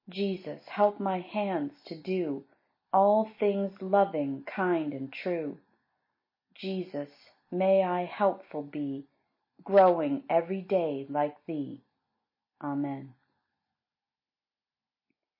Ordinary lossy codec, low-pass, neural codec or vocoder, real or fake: MP3, 24 kbps; 5.4 kHz; none; real